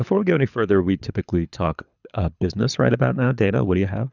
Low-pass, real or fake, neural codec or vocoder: 7.2 kHz; fake; codec, 24 kHz, 6 kbps, HILCodec